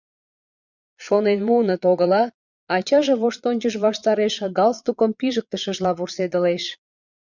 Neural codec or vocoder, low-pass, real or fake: vocoder, 22.05 kHz, 80 mel bands, Vocos; 7.2 kHz; fake